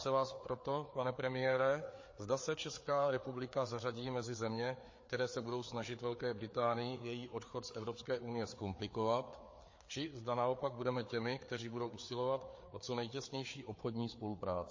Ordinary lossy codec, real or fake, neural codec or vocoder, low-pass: MP3, 32 kbps; fake; codec, 16 kHz, 4 kbps, FreqCodec, larger model; 7.2 kHz